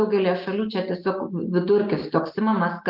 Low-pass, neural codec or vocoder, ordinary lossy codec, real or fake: 5.4 kHz; none; Opus, 24 kbps; real